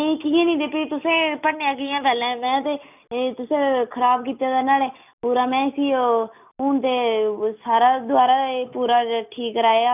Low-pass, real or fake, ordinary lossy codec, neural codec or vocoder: 3.6 kHz; real; none; none